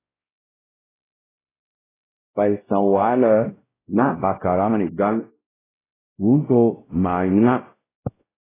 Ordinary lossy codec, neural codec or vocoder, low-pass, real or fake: AAC, 16 kbps; codec, 16 kHz, 0.5 kbps, X-Codec, WavLM features, trained on Multilingual LibriSpeech; 3.6 kHz; fake